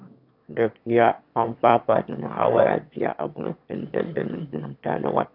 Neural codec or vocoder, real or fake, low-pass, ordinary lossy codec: autoencoder, 22.05 kHz, a latent of 192 numbers a frame, VITS, trained on one speaker; fake; 5.4 kHz; none